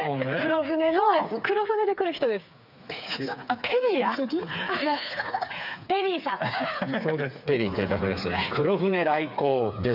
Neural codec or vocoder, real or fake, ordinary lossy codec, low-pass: codec, 16 kHz, 4 kbps, FreqCodec, smaller model; fake; none; 5.4 kHz